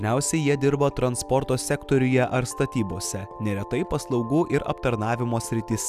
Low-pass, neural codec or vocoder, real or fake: 14.4 kHz; none; real